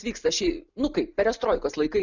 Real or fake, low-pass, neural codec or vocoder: real; 7.2 kHz; none